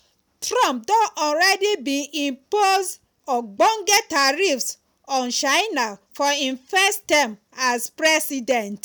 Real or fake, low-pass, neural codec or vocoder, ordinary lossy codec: real; none; none; none